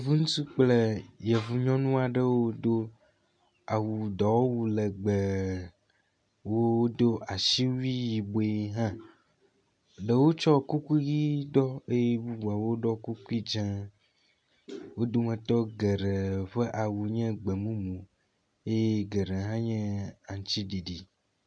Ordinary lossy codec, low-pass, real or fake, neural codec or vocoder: MP3, 64 kbps; 9.9 kHz; real; none